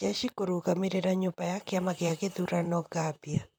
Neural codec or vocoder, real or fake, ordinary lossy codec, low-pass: vocoder, 44.1 kHz, 128 mel bands, Pupu-Vocoder; fake; none; none